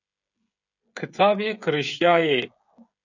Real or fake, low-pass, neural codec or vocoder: fake; 7.2 kHz; codec, 16 kHz, 16 kbps, FreqCodec, smaller model